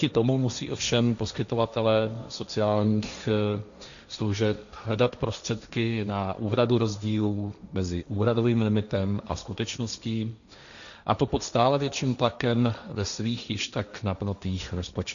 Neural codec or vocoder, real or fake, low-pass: codec, 16 kHz, 1.1 kbps, Voila-Tokenizer; fake; 7.2 kHz